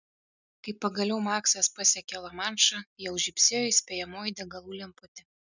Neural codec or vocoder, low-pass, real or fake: none; 7.2 kHz; real